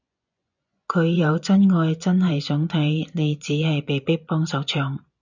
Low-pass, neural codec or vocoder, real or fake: 7.2 kHz; vocoder, 24 kHz, 100 mel bands, Vocos; fake